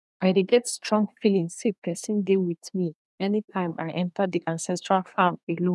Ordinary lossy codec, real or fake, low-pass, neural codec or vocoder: none; fake; none; codec, 24 kHz, 1 kbps, SNAC